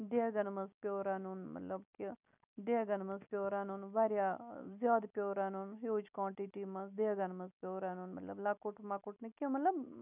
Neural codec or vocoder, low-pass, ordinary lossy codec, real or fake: none; 3.6 kHz; none; real